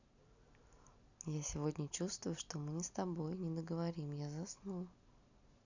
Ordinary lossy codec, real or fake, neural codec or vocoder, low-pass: none; real; none; 7.2 kHz